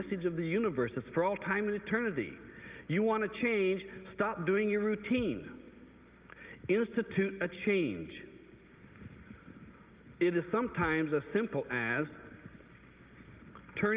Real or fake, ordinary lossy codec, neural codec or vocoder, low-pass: real; Opus, 32 kbps; none; 3.6 kHz